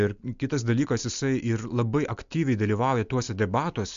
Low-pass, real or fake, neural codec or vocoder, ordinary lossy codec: 7.2 kHz; real; none; MP3, 64 kbps